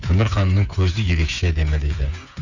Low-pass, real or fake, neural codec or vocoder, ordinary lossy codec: 7.2 kHz; fake; codec, 16 kHz, 8 kbps, FreqCodec, smaller model; none